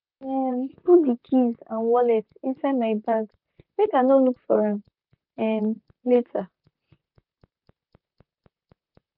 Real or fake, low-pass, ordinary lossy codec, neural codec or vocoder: fake; 5.4 kHz; none; vocoder, 44.1 kHz, 128 mel bands, Pupu-Vocoder